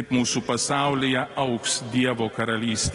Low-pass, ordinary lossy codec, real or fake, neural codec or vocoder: 10.8 kHz; AAC, 32 kbps; real; none